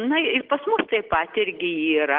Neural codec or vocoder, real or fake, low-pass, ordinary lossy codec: none; real; 5.4 kHz; Opus, 16 kbps